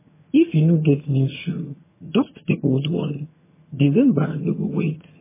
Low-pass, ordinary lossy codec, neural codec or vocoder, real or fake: 3.6 kHz; MP3, 16 kbps; vocoder, 22.05 kHz, 80 mel bands, HiFi-GAN; fake